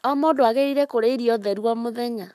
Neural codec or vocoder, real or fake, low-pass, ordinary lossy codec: codec, 44.1 kHz, 7.8 kbps, Pupu-Codec; fake; 14.4 kHz; none